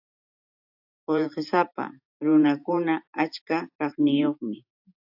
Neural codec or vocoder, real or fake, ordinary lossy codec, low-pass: vocoder, 44.1 kHz, 128 mel bands every 512 samples, BigVGAN v2; fake; AAC, 48 kbps; 5.4 kHz